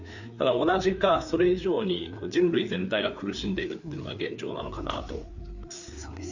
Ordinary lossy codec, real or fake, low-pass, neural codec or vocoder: none; fake; 7.2 kHz; codec, 16 kHz, 4 kbps, FreqCodec, larger model